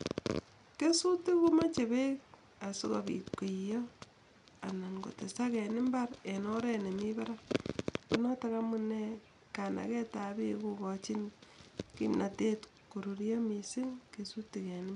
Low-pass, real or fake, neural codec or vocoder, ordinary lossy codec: 10.8 kHz; real; none; none